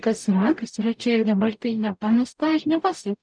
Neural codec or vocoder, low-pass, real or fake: codec, 44.1 kHz, 0.9 kbps, DAC; 9.9 kHz; fake